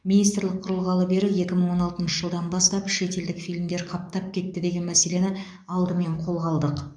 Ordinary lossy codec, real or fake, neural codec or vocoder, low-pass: none; fake; codec, 44.1 kHz, 7.8 kbps, DAC; 9.9 kHz